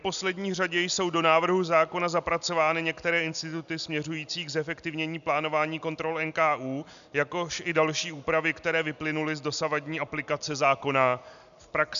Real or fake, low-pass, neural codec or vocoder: real; 7.2 kHz; none